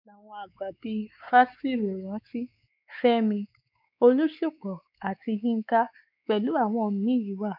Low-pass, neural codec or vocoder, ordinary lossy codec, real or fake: 5.4 kHz; codec, 16 kHz, 4 kbps, X-Codec, WavLM features, trained on Multilingual LibriSpeech; none; fake